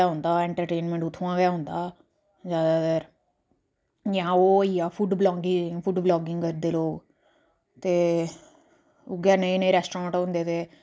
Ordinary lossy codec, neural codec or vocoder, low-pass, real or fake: none; none; none; real